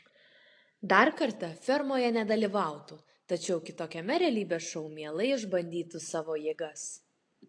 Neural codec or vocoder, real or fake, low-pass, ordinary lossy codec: none; real; 9.9 kHz; AAC, 48 kbps